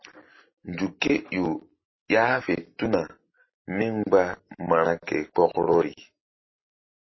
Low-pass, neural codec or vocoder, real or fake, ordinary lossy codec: 7.2 kHz; none; real; MP3, 24 kbps